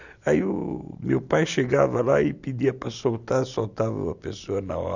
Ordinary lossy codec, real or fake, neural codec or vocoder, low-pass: none; real; none; 7.2 kHz